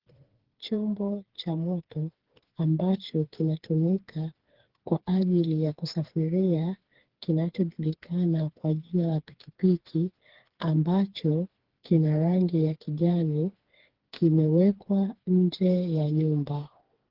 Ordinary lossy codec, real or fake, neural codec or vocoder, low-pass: Opus, 16 kbps; fake; codec, 16 kHz, 4 kbps, FreqCodec, smaller model; 5.4 kHz